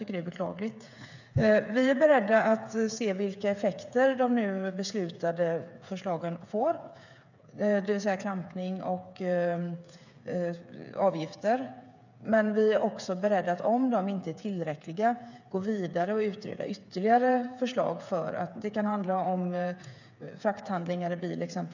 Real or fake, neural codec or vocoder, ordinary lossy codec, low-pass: fake; codec, 16 kHz, 8 kbps, FreqCodec, smaller model; none; 7.2 kHz